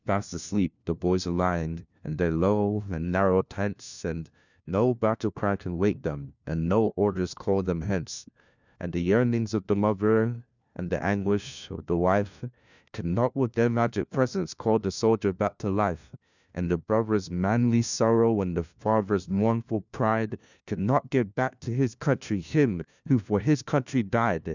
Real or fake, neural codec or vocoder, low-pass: fake; codec, 16 kHz, 1 kbps, FunCodec, trained on LibriTTS, 50 frames a second; 7.2 kHz